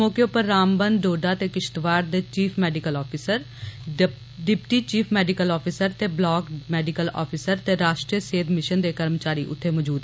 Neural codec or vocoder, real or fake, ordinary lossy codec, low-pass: none; real; none; none